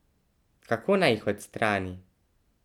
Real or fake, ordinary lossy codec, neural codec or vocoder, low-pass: real; none; none; 19.8 kHz